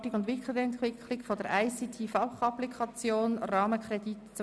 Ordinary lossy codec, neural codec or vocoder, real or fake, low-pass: none; none; real; none